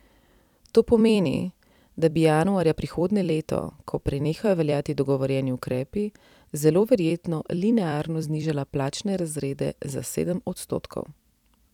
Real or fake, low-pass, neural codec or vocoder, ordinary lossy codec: fake; 19.8 kHz; vocoder, 44.1 kHz, 128 mel bands every 256 samples, BigVGAN v2; none